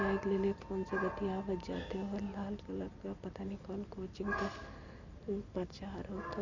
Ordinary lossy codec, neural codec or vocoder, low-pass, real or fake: none; none; 7.2 kHz; real